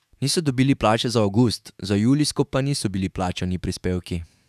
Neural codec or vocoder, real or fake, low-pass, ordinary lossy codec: autoencoder, 48 kHz, 128 numbers a frame, DAC-VAE, trained on Japanese speech; fake; 14.4 kHz; none